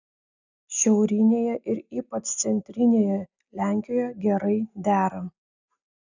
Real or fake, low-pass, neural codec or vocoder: real; 7.2 kHz; none